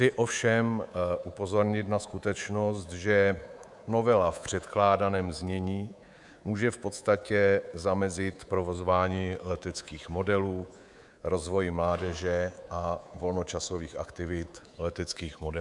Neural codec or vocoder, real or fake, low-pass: codec, 24 kHz, 3.1 kbps, DualCodec; fake; 10.8 kHz